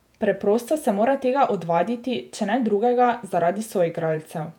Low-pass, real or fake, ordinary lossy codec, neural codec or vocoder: 19.8 kHz; fake; none; vocoder, 48 kHz, 128 mel bands, Vocos